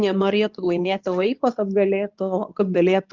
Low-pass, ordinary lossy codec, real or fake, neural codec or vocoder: 7.2 kHz; Opus, 32 kbps; fake; codec, 24 kHz, 0.9 kbps, WavTokenizer, medium speech release version 2